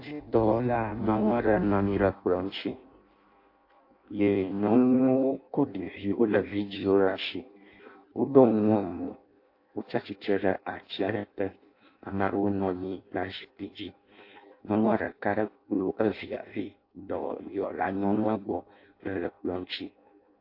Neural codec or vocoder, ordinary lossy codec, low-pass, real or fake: codec, 16 kHz in and 24 kHz out, 0.6 kbps, FireRedTTS-2 codec; AAC, 32 kbps; 5.4 kHz; fake